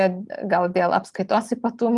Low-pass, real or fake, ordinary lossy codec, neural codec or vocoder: 10.8 kHz; real; Opus, 32 kbps; none